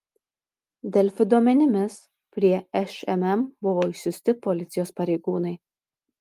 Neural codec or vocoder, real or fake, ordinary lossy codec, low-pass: none; real; Opus, 32 kbps; 14.4 kHz